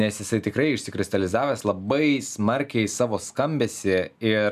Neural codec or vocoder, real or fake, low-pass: none; real; 14.4 kHz